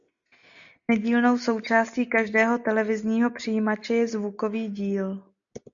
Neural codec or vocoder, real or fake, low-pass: none; real; 7.2 kHz